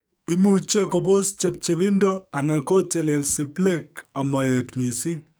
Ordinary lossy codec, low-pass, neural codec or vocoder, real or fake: none; none; codec, 44.1 kHz, 2.6 kbps, SNAC; fake